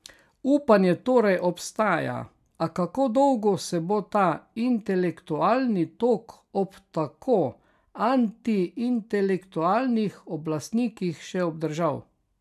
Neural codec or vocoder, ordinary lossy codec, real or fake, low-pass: none; none; real; 14.4 kHz